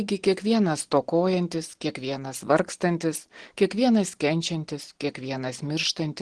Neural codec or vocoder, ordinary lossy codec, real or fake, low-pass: none; Opus, 16 kbps; real; 9.9 kHz